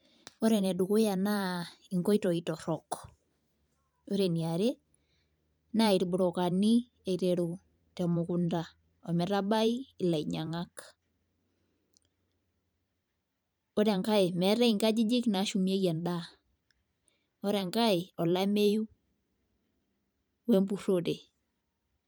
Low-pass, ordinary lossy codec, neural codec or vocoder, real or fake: none; none; vocoder, 44.1 kHz, 128 mel bands every 512 samples, BigVGAN v2; fake